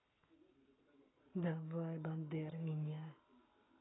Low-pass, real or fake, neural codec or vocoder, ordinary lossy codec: 7.2 kHz; fake; codec, 44.1 kHz, 7.8 kbps, Pupu-Codec; AAC, 16 kbps